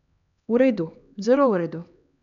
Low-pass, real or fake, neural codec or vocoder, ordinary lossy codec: 7.2 kHz; fake; codec, 16 kHz, 2 kbps, X-Codec, HuBERT features, trained on LibriSpeech; none